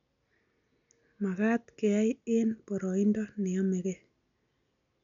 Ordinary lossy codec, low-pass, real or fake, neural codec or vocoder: none; 7.2 kHz; real; none